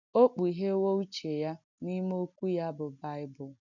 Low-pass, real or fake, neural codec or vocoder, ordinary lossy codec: 7.2 kHz; real; none; none